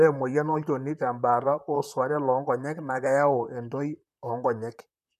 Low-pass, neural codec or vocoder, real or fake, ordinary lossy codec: 14.4 kHz; vocoder, 44.1 kHz, 128 mel bands, Pupu-Vocoder; fake; none